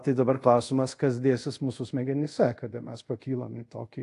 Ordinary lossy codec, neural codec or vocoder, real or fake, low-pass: AAC, 48 kbps; codec, 24 kHz, 0.5 kbps, DualCodec; fake; 10.8 kHz